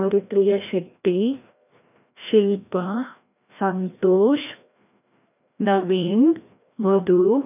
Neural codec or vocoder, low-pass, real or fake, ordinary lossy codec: codec, 16 kHz, 1 kbps, FreqCodec, larger model; 3.6 kHz; fake; none